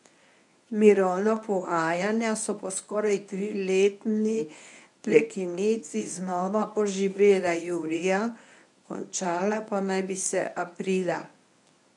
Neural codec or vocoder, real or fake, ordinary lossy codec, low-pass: codec, 24 kHz, 0.9 kbps, WavTokenizer, medium speech release version 1; fake; none; 10.8 kHz